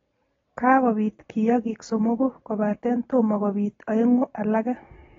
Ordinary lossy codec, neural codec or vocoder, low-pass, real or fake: AAC, 24 kbps; none; 7.2 kHz; real